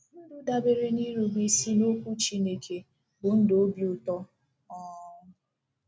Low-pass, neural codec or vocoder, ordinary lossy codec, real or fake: none; none; none; real